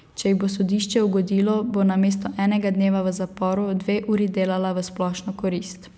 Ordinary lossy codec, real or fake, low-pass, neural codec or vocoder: none; real; none; none